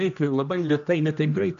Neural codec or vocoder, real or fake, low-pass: codec, 16 kHz, 1 kbps, X-Codec, HuBERT features, trained on general audio; fake; 7.2 kHz